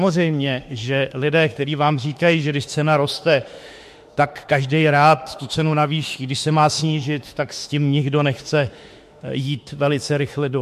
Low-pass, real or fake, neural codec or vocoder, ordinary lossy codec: 14.4 kHz; fake; autoencoder, 48 kHz, 32 numbers a frame, DAC-VAE, trained on Japanese speech; MP3, 64 kbps